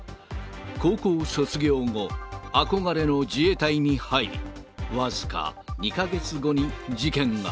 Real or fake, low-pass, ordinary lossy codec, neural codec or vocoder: real; none; none; none